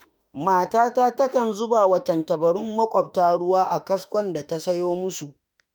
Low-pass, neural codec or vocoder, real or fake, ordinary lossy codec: none; autoencoder, 48 kHz, 32 numbers a frame, DAC-VAE, trained on Japanese speech; fake; none